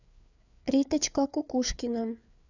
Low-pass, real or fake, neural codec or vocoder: 7.2 kHz; fake; codec, 16 kHz, 4 kbps, FreqCodec, larger model